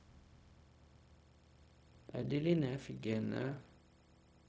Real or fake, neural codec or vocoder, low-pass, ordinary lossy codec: fake; codec, 16 kHz, 0.4 kbps, LongCat-Audio-Codec; none; none